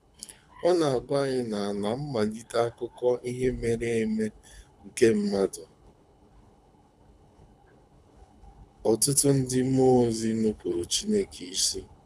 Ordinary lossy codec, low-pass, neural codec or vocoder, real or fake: none; none; codec, 24 kHz, 6 kbps, HILCodec; fake